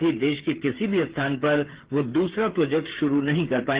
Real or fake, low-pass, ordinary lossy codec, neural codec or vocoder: fake; 3.6 kHz; Opus, 16 kbps; codec, 16 kHz, 8 kbps, FreqCodec, smaller model